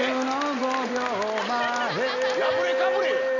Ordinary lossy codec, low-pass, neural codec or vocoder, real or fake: none; 7.2 kHz; none; real